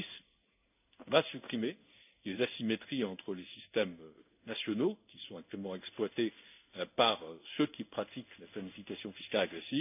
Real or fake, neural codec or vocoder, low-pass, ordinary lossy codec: fake; codec, 16 kHz in and 24 kHz out, 1 kbps, XY-Tokenizer; 3.6 kHz; none